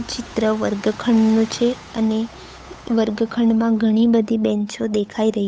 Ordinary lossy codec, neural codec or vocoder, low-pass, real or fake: none; codec, 16 kHz, 8 kbps, FunCodec, trained on Chinese and English, 25 frames a second; none; fake